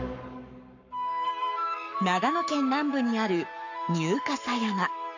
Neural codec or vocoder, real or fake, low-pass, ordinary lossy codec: codec, 44.1 kHz, 7.8 kbps, Pupu-Codec; fake; 7.2 kHz; none